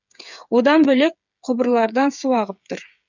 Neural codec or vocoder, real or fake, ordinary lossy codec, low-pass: codec, 16 kHz, 8 kbps, FreqCodec, smaller model; fake; none; 7.2 kHz